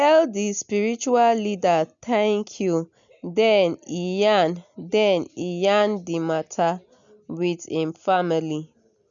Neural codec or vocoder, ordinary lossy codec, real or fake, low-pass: none; none; real; 7.2 kHz